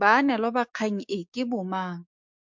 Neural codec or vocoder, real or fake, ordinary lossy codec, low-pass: codec, 16 kHz, 6 kbps, DAC; fake; MP3, 64 kbps; 7.2 kHz